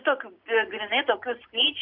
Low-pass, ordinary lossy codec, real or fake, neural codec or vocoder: 5.4 kHz; Opus, 64 kbps; real; none